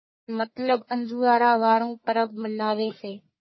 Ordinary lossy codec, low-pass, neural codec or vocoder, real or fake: MP3, 24 kbps; 7.2 kHz; codec, 44.1 kHz, 1.7 kbps, Pupu-Codec; fake